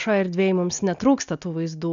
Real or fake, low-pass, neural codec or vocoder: real; 7.2 kHz; none